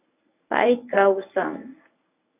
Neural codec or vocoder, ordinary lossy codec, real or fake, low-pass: codec, 24 kHz, 0.9 kbps, WavTokenizer, medium speech release version 1; AAC, 32 kbps; fake; 3.6 kHz